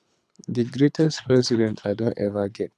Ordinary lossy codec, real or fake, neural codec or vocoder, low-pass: none; fake; codec, 24 kHz, 6 kbps, HILCodec; none